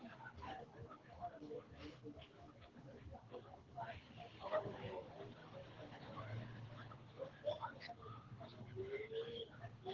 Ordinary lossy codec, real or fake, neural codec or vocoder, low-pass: Opus, 16 kbps; fake; codec, 24 kHz, 6 kbps, HILCodec; 7.2 kHz